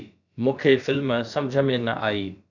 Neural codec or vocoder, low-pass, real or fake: codec, 16 kHz, about 1 kbps, DyCAST, with the encoder's durations; 7.2 kHz; fake